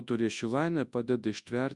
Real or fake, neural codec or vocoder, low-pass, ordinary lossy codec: fake; codec, 24 kHz, 0.9 kbps, WavTokenizer, large speech release; 10.8 kHz; Opus, 64 kbps